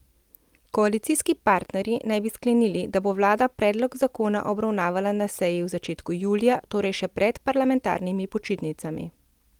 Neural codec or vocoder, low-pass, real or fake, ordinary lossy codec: none; 19.8 kHz; real; Opus, 24 kbps